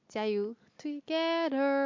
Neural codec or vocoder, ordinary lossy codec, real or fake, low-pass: none; MP3, 48 kbps; real; 7.2 kHz